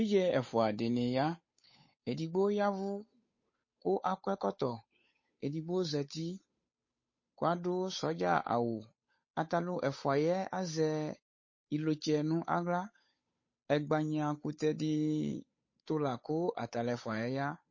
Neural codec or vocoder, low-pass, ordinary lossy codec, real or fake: codec, 16 kHz, 8 kbps, FunCodec, trained on Chinese and English, 25 frames a second; 7.2 kHz; MP3, 32 kbps; fake